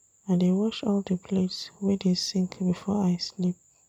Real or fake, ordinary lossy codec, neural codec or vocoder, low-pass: fake; none; vocoder, 44.1 kHz, 128 mel bands every 256 samples, BigVGAN v2; 19.8 kHz